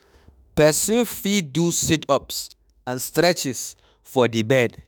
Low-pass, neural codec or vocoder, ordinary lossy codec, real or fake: none; autoencoder, 48 kHz, 32 numbers a frame, DAC-VAE, trained on Japanese speech; none; fake